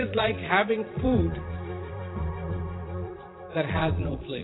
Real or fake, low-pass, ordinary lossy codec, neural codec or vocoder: fake; 7.2 kHz; AAC, 16 kbps; vocoder, 22.05 kHz, 80 mel bands, Vocos